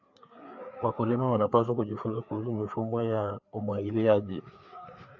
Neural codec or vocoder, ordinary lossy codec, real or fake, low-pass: codec, 16 kHz, 4 kbps, FreqCodec, larger model; AAC, 48 kbps; fake; 7.2 kHz